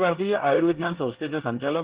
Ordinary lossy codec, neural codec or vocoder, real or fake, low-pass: Opus, 16 kbps; codec, 24 kHz, 1 kbps, SNAC; fake; 3.6 kHz